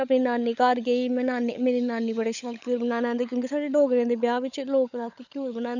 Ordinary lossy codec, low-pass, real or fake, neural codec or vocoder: none; 7.2 kHz; fake; codec, 16 kHz, 16 kbps, FunCodec, trained on LibriTTS, 50 frames a second